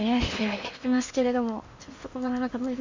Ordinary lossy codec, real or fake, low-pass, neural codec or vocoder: MP3, 48 kbps; fake; 7.2 kHz; codec, 16 kHz in and 24 kHz out, 0.8 kbps, FocalCodec, streaming, 65536 codes